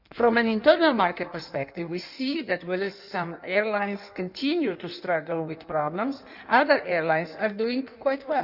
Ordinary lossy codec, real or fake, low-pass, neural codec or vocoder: none; fake; 5.4 kHz; codec, 16 kHz in and 24 kHz out, 1.1 kbps, FireRedTTS-2 codec